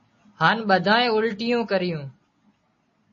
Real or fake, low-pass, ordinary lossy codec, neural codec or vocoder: real; 7.2 kHz; MP3, 32 kbps; none